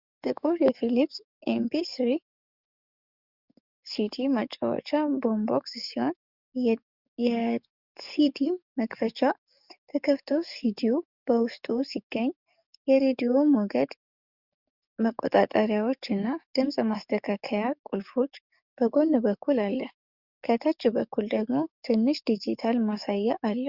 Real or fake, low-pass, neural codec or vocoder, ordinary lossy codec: fake; 5.4 kHz; codec, 44.1 kHz, 7.8 kbps, DAC; Opus, 64 kbps